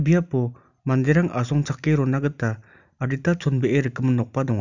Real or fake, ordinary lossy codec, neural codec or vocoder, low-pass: real; none; none; 7.2 kHz